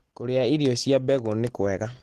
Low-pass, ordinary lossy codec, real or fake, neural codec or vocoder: 14.4 kHz; Opus, 16 kbps; real; none